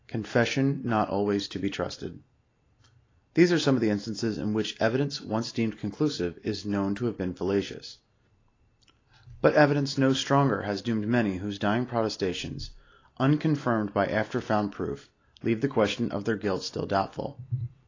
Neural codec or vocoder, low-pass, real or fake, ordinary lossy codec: none; 7.2 kHz; real; AAC, 32 kbps